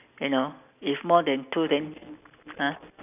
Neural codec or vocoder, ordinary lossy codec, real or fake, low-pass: none; none; real; 3.6 kHz